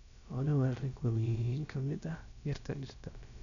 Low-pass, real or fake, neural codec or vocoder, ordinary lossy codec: 7.2 kHz; fake; codec, 16 kHz, about 1 kbps, DyCAST, with the encoder's durations; none